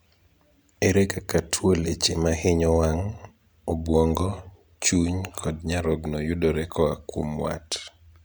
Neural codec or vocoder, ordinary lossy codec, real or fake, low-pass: none; none; real; none